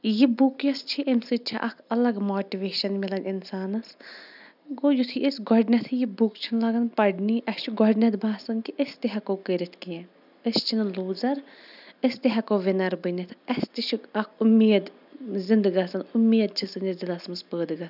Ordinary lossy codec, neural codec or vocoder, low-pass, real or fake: none; none; 5.4 kHz; real